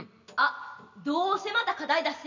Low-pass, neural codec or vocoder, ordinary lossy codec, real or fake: 7.2 kHz; none; none; real